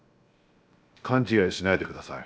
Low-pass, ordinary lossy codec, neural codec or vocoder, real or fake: none; none; codec, 16 kHz, 0.7 kbps, FocalCodec; fake